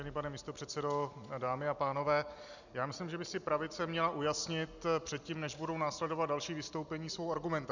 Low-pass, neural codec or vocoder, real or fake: 7.2 kHz; none; real